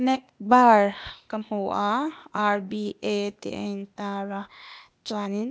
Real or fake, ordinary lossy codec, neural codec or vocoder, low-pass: fake; none; codec, 16 kHz, 0.8 kbps, ZipCodec; none